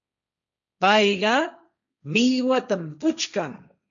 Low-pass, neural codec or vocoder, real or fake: 7.2 kHz; codec, 16 kHz, 1.1 kbps, Voila-Tokenizer; fake